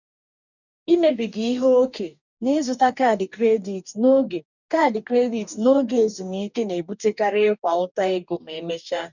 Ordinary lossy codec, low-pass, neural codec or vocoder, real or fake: none; 7.2 kHz; codec, 44.1 kHz, 2.6 kbps, DAC; fake